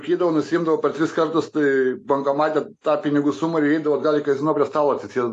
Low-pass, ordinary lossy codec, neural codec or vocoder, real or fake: 10.8 kHz; AAC, 48 kbps; none; real